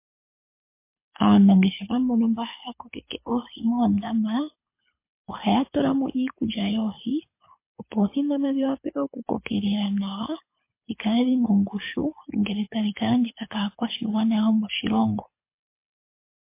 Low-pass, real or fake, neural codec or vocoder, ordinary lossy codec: 3.6 kHz; fake; codec, 24 kHz, 6 kbps, HILCodec; MP3, 24 kbps